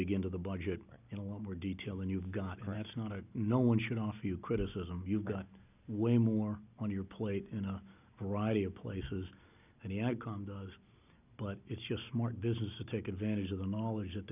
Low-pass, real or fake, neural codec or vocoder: 3.6 kHz; real; none